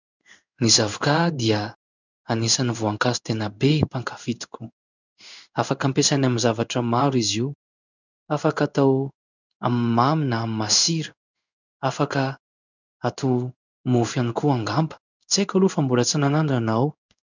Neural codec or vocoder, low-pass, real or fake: codec, 16 kHz in and 24 kHz out, 1 kbps, XY-Tokenizer; 7.2 kHz; fake